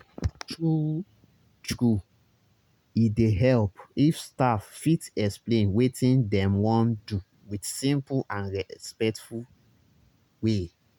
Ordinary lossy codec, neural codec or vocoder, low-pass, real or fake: none; none; 19.8 kHz; real